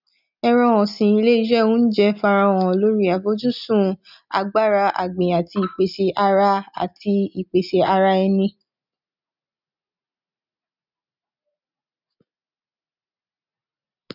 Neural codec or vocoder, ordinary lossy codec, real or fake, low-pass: none; none; real; 5.4 kHz